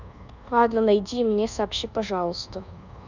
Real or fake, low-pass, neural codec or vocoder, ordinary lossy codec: fake; 7.2 kHz; codec, 24 kHz, 1.2 kbps, DualCodec; none